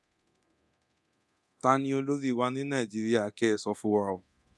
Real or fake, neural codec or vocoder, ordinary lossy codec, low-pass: fake; codec, 24 kHz, 0.9 kbps, DualCodec; none; none